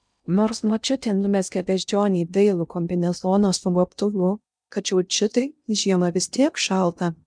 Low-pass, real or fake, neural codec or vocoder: 9.9 kHz; fake; codec, 16 kHz in and 24 kHz out, 0.6 kbps, FocalCodec, streaming, 2048 codes